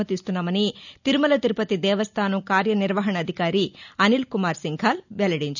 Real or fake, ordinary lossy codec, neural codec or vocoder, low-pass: real; none; none; 7.2 kHz